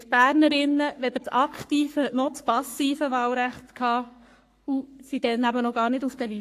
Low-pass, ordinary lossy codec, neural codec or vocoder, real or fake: 14.4 kHz; AAC, 64 kbps; codec, 44.1 kHz, 3.4 kbps, Pupu-Codec; fake